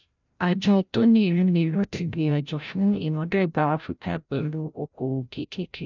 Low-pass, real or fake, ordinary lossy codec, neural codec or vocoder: 7.2 kHz; fake; none; codec, 16 kHz, 0.5 kbps, FreqCodec, larger model